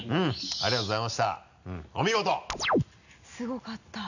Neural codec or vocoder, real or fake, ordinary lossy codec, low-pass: none; real; none; 7.2 kHz